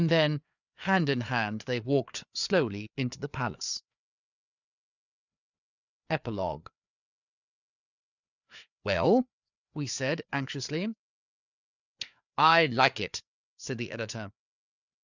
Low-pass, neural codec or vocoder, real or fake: 7.2 kHz; codec, 16 kHz, 4 kbps, FreqCodec, larger model; fake